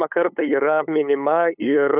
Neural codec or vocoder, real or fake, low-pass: codec, 16 kHz, 8 kbps, FunCodec, trained on LibriTTS, 25 frames a second; fake; 3.6 kHz